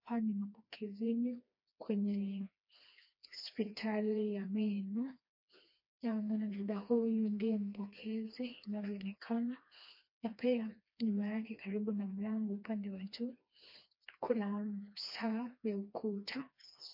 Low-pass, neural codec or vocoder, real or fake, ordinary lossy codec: 5.4 kHz; codec, 16 kHz, 2 kbps, FreqCodec, smaller model; fake; MP3, 32 kbps